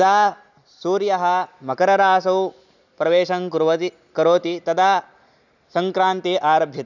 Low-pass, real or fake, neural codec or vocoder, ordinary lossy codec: 7.2 kHz; real; none; none